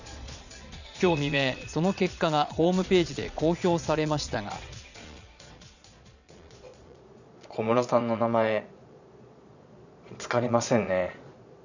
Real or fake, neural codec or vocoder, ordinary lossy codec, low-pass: fake; vocoder, 22.05 kHz, 80 mel bands, Vocos; none; 7.2 kHz